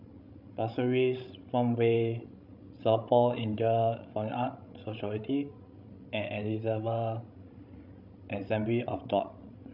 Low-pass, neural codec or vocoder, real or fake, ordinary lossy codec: 5.4 kHz; codec, 16 kHz, 16 kbps, FreqCodec, larger model; fake; none